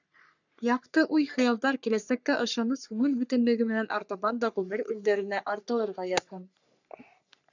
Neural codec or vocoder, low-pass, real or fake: codec, 44.1 kHz, 3.4 kbps, Pupu-Codec; 7.2 kHz; fake